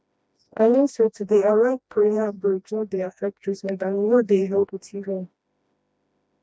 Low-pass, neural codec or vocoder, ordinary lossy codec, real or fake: none; codec, 16 kHz, 1 kbps, FreqCodec, smaller model; none; fake